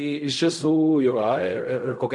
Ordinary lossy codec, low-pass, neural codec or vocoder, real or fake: MP3, 48 kbps; 10.8 kHz; codec, 16 kHz in and 24 kHz out, 0.4 kbps, LongCat-Audio-Codec, fine tuned four codebook decoder; fake